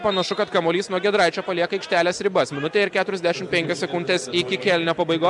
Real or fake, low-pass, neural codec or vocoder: real; 10.8 kHz; none